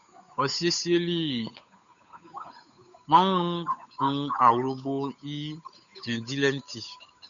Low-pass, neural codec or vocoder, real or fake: 7.2 kHz; codec, 16 kHz, 8 kbps, FunCodec, trained on Chinese and English, 25 frames a second; fake